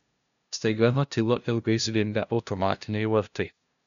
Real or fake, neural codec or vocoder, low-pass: fake; codec, 16 kHz, 0.5 kbps, FunCodec, trained on LibriTTS, 25 frames a second; 7.2 kHz